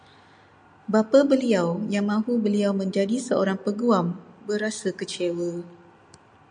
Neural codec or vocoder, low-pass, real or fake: none; 9.9 kHz; real